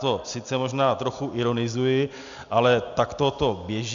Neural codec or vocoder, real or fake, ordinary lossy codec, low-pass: none; real; MP3, 96 kbps; 7.2 kHz